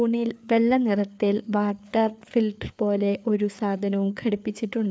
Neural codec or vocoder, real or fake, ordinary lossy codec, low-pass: codec, 16 kHz, 4 kbps, FreqCodec, larger model; fake; none; none